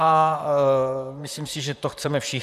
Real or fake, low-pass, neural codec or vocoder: fake; 14.4 kHz; vocoder, 44.1 kHz, 128 mel bands, Pupu-Vocoder